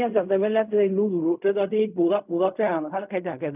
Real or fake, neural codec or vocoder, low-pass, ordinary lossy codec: fake; codec, 16 kHz in and 24 kHz out, 0.4 kbps, LongCat-Audio-Codec, fine tuned four codebook decoder; 3.6 kHz; none